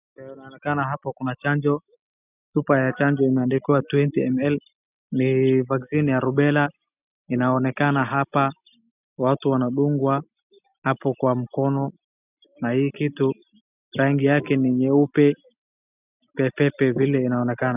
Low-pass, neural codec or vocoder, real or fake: 3.6 kHz; none; real